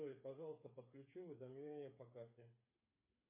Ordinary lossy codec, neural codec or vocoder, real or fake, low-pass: MP3, 24 kbps; codec, 16 kHz, 8 kbps, FreqCodec, smaller model; fake; 3.6 kHz